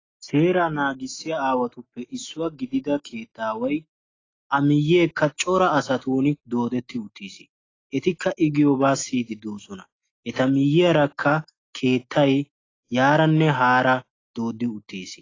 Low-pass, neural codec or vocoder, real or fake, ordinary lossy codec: 7.2 kHz; none; real; AAC, 32 kbps